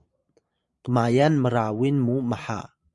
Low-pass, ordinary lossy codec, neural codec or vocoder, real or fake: 10.8 kHz; Opus, 64 kbps; vocoder, 44.1 kHz, 128 mel bands every 512 samples, BigVGAN v2; fake